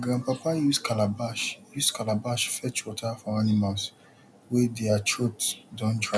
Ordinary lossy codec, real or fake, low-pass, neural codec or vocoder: none; real; none; none